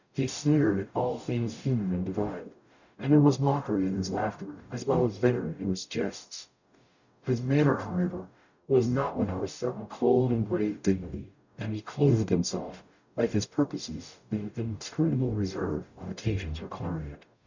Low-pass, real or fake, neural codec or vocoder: 7.2 kHz; fake; codec, 44.1 kHz, 0.9 kbps, DAC